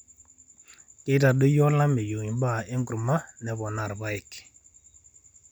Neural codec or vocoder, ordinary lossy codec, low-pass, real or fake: none; none; 19.8 kHz; real